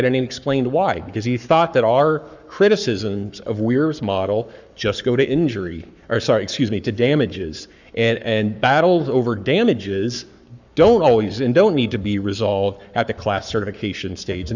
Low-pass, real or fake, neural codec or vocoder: 7.2 kHz; fake; codec, 44.1 kHz, 7.8 kbps, Pupu-Codec